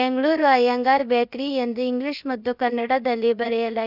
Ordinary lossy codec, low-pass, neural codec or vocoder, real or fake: none; 5.4 kHz; codec, 16 kHz, about 1 kbps, DyCAST, with the encoder's durations; fake